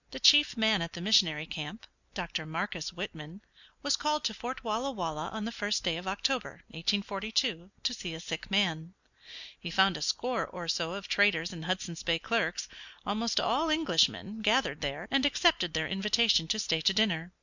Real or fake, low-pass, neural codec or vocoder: real; 7.2 kHz; none